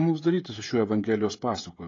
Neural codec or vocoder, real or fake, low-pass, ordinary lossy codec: codec, 16 kHz, 16 kbps, FreqCodec, smaller model; fake; 7.2 kHz; AAC, 32 kbps